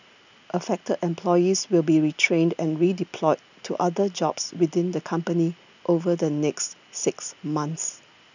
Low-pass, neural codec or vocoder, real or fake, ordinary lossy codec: 7.2 kHz; none; real; none